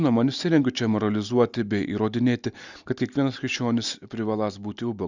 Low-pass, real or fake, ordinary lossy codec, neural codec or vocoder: 7.2 kHz; real; Opus, 64 kbps; none